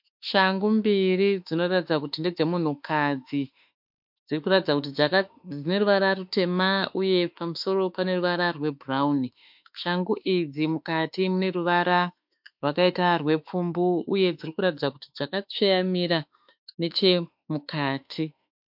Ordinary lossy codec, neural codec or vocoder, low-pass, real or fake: MP3, 48 kbps; autoencoder, 48 kHz, 32 numbers a frame, DAC-VAE, trained on Japanese speech; 5.4 kHz; fake